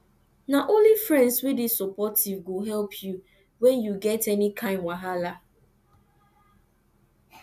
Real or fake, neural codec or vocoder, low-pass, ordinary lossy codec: real; none; 14.4 kHz; none